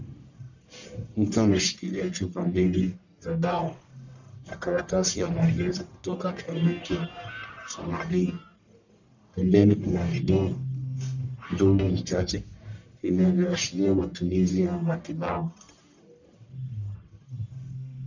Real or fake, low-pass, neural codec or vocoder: fake; 7.2 kHz; codec, 44.1 kHz, 1.7 kbps, Pupu-Codec